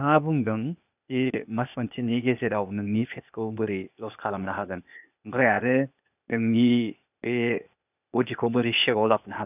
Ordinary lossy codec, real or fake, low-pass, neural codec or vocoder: none; fake; 3.6 kHz; codec, 16 kHz, 0.8 kbps, ZipCodec